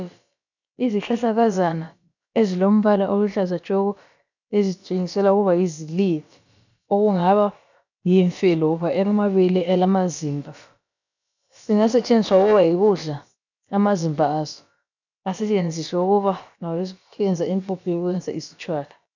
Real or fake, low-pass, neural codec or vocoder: fake; 7.2 kHz; codec, 16 kHz, about 1 kbps, DyCAST, with the encoder's durations